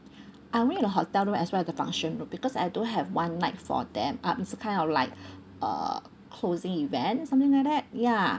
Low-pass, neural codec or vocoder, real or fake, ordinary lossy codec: none; none; real; none